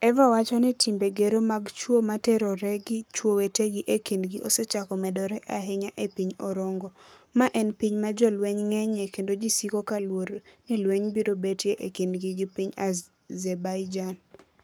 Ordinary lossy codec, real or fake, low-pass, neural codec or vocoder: none; fake; none; codec, 44.1 kHz, 7.8 kbps, Pupu-Codec